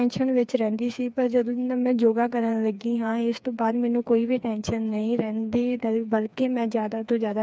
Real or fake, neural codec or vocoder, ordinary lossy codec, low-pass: fake; codec, 16 kHz, 4 kbps, FreqCodec, smaller model; none; none